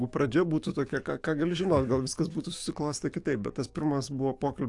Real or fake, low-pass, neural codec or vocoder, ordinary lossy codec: fake; 10.8 kHz; codec, 44.1 kHz, 7.8 kbps, DAC; AAC, 64 kbps